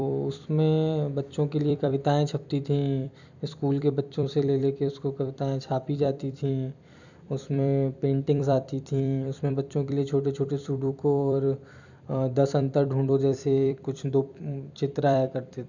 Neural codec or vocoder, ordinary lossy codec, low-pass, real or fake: vocoder, 44.1 kHz, 128 mel bands every 256 samples, BigVGAN v2; none; 7.2 kHz; fake